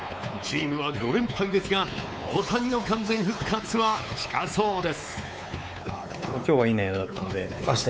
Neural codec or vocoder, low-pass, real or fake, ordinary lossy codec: codec, 16 kHz, 4 kbps, X-Codec, WavLM features, trained on Multilingual LibriSpeech; none; fake; none